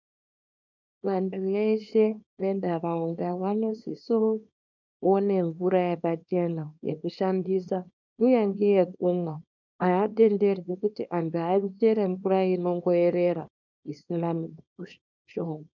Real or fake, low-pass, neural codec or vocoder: fake; 7.2 kHz; codec, 24 kHz, 0.9 kbps, WavTokenizer, small release